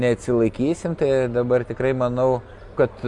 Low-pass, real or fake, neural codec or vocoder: 10.8 kHz; real; none